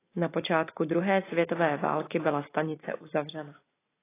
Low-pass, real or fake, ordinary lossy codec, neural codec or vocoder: 3.6 kHz; real; AAC, 16 kbps; none